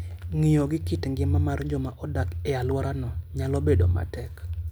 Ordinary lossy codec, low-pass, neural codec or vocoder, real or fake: none; none; none; real